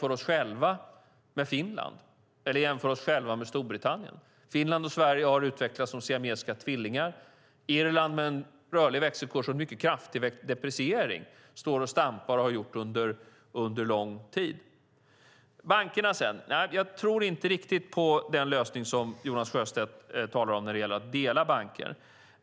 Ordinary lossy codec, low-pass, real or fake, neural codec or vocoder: none; none; real; none